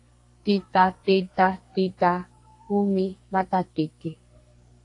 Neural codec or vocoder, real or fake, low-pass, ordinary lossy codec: codec, 44.1 kHz, 2.6 kbps, SNAC; fake; 10.8 kHz; AAC, 48 kbps